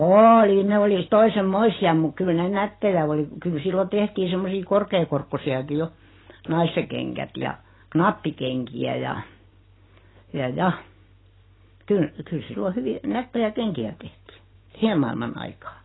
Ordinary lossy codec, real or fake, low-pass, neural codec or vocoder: AAC, 16 kbps; real; 7.2 kHz; none